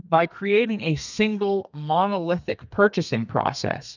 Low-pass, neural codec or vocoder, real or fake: 7.2 kHz; codec, 32 kHz, 1.9 kbps, SNAC; fake